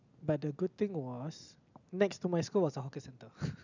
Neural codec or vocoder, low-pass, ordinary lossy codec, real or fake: none; 7.2 kHz; none; real